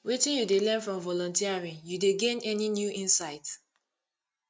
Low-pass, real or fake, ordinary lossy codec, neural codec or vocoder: none; real; none; none